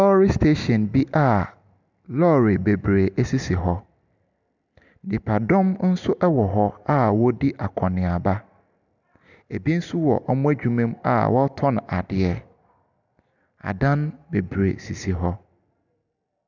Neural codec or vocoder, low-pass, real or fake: none; 7.2 kHz; real